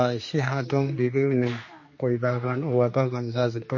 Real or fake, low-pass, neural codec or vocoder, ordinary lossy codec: fake; 7.2 kHz; codec, 16 kHz, 2 kbps, X-Codec, HuBERT features, trained on general audio; MP3, 32 kbps